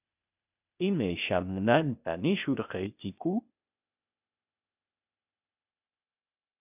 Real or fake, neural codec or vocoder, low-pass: fake; codec, 16 kHz, 0.8 kbps, ZipCodec; 3.6 kHz